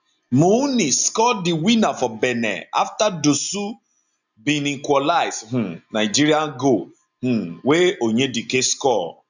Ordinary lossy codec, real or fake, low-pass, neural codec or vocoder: none; real; 7.2 kHz; none